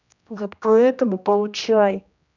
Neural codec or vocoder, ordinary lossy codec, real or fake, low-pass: codec, 16 kHz, 1 kbps, X-Codec, HuBERT features, trained on general audio; none; fake; 7.2 kHz